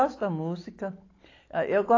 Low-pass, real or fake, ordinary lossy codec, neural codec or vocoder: 7.2 kHz; real; AAC, 32 kbps; none